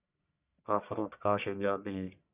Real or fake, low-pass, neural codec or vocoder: fake; 3.6 kHz; codec, 44.1 kHz, 1.7 kbps, Pupu-Codec